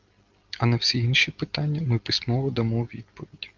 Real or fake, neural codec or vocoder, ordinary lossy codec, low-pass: real; none; Opus, 24 kbps; 7.2 kHz